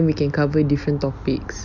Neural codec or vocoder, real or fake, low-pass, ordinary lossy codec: none; real; 7.2 kHz; none